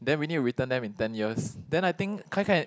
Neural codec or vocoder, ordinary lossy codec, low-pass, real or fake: none; none; none; real